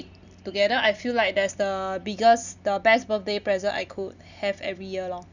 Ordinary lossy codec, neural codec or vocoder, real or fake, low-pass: none; none; real; 7.2 kHz